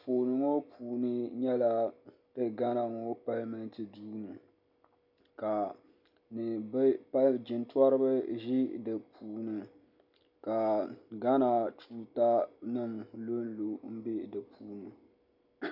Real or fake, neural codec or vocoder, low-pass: real; none; 5.4 kHz